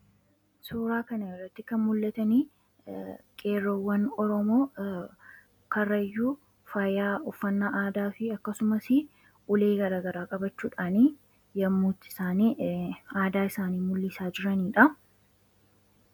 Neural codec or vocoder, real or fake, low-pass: none; real; 19.8 kHz